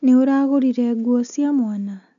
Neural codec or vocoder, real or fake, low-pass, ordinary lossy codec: none; real; 7.2 kHz; none